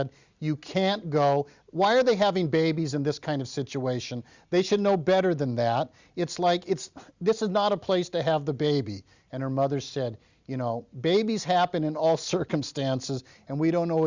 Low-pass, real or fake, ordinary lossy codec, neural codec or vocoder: 7.2 kHz; real; Opus, 64 kbps; none